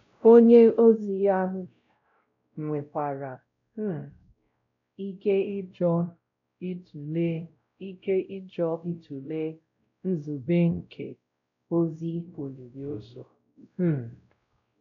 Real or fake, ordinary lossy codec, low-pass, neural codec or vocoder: fake; none; 7.2 kHz; codec, 16 kHz, 0.5 kbps, X-Codec, WavLM features, trained on Multilingual LibriSpeech